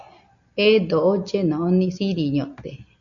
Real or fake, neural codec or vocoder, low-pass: real; none; 7.2 kHz